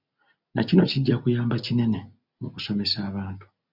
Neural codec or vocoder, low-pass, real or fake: none; 5.4 kHz; real